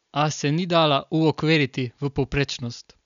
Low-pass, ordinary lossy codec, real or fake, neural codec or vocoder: 7.2 kHz; none; real; none